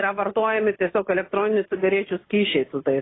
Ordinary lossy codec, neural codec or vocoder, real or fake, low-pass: AAC, 16 kbps; none; real; 7.2 kHz